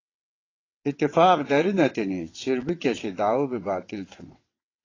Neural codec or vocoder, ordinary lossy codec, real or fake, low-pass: vocoder, 22.05 kHz, 80 mel bands, WaveNeXt; AAC, 32 kbps; fake; 7.2 kHz